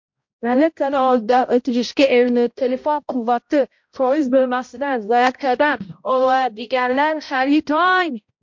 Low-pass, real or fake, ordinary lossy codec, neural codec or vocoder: 7.2 kHz; fake; MP3, 48 kbps; codec, 16 kHz, 0.5 kbps, X-Codec, HuBERT features, trained on balanced general audio